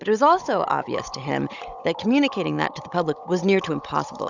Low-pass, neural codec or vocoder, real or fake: 7.2 kHz; codec, 16 kHz, 16 kbps, FunCodec, trained on Chinese and English, 50 frames a second; fake